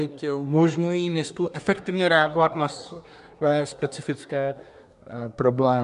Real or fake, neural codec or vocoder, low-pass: fake; codec, 24 kHz, 1 kbps, SNAC; 10.8 kHz